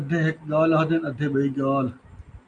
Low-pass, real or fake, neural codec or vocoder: 9.9 kHz; real; none